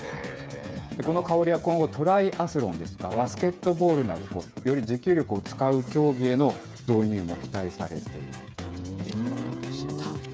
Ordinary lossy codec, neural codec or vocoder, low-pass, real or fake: none; codec, 16 kHz, 8 kbps, FreqCodec, smaller model; none; fake